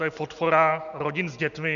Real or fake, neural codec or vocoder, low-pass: real; none; 7.2 kHz